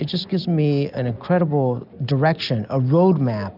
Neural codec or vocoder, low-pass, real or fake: none; 5.4 kHz; real